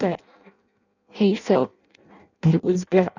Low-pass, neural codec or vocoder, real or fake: 7.2 kHz; codec, 16 kHz in and 24 kHz out, 0.6 kbps, FireRedTTS-2 codec; fake